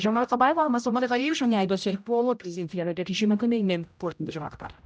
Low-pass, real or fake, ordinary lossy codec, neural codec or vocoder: none; fake; none; codec, 16 kHz, 0.5 kbps, X-Codec, HuBERT features, trained on general audio